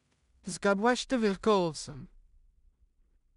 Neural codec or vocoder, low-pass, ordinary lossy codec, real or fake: codec, 16 kHz in and 24 kHz out, 0.4 kbps, LongCat-Audio-Codec, two codebook decoder; 10.8 kHz; none; fake